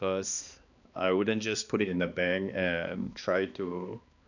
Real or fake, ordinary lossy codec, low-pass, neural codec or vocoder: fake; none; 7.2 kHz; codec, 16 kHz, 2 kbps, X-Codec, HuBERT features, trained on balanced general audio